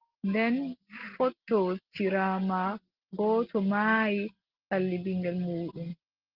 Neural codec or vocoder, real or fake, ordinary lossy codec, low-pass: none; real; Opus, 16 kbps; 5.4 kHz